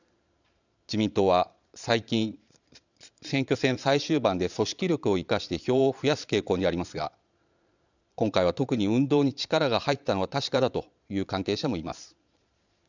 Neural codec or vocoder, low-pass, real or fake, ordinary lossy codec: vocoder, 22.05 kHz, 80 mel bands, Vocos; 7.2 kHz; fake; none